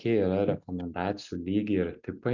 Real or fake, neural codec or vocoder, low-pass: real; none; 7.2 kHz